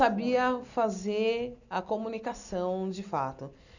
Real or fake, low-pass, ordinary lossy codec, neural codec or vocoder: real; 7.2 kHz; none; none